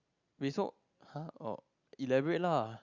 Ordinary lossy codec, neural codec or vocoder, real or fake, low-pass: Opus, 64 kbps; none; real; 7.2 kHz